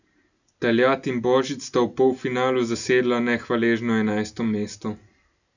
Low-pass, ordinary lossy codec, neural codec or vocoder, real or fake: 7.2 kHz; none; none; real